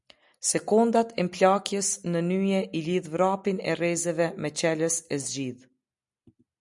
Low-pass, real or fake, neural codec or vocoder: 10.8 kHz; real; none